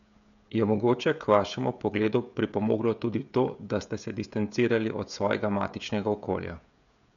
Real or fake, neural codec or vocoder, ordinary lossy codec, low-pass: fake; codec, 16 kHz, 16 kbps, FreqCodec, smaller model; none; 7.2 kHz